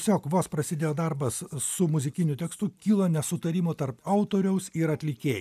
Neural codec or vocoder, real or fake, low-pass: none; real; 14.4 kHz